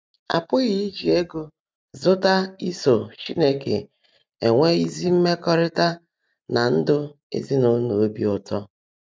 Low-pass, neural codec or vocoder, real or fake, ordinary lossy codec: none; none; real; none